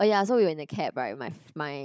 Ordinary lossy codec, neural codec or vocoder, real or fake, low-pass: none; codec, 16 kHz, 16 kbps, FunCodec, trained on Chinese and English, 50 frames a second; fake; none